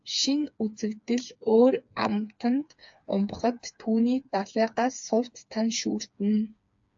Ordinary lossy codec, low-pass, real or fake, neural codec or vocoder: AAC, 64 kbps; 7.2 kHz; fake; codec, 16 kHz, 4 kbps, FreqCodec, smaller model